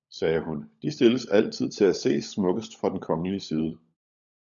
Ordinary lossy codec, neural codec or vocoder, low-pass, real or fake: MP3, 96 kbps; codec, 16 kHz, 16 kbps, FunCodec, trained on LibriTTS, 50 frames a second; 7.2 kHz; fake